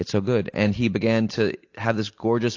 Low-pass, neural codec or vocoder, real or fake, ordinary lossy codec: 7.2 kHz; none; real; AAC, 48 kbps